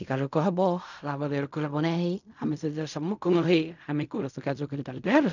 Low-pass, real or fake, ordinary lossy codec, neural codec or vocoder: 7.2 kHz; fake; none; codec, 16 kHz in and 24 kHz out, 0.4 kbps, LongCat-Audio-Codec, fine tuned four codebook decoder